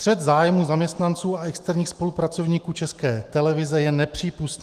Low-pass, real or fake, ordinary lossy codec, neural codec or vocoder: 14.4 kHz; fake; Opus, 24 kbps; autoencoder, 48 kHz, 128 numbers a frame, DAC-VAE, trained on Japanese speech